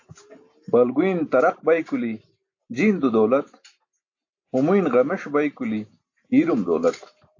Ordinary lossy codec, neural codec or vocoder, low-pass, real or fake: AAC, 48 kbps; none; 7.2 kHz; real